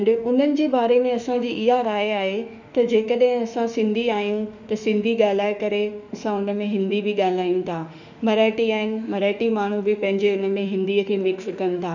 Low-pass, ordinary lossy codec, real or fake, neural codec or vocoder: 7.2 kHz; none; fake; autoencoder, 48 kHz, 32 numbers a frame, DAC-VAE, trained on Japanese speech